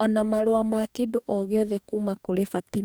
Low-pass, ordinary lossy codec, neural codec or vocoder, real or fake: none; none; codec, 44.1 kHz, 2.6 kbps, DAC; fake